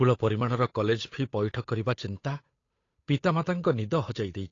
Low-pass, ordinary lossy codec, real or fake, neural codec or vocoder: 7.2 kHz; AAC, 32 kbps; fake; codec, 16 kHz, 8 kbps, FunCodec, trained on Chinese and English, 25 frames a second